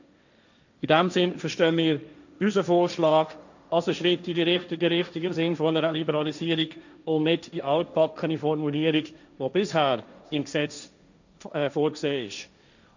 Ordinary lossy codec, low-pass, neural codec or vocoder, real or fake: none; 7.2 kHz; codec, 16 kHz, 1.1 kbps, Voila-Tokenizer; fake